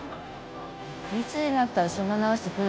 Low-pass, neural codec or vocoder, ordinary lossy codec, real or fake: none; codec, 16 kHz, 0.5 kbps, FunCodec, trained on Chinese and English, 25 frames a second; none; fake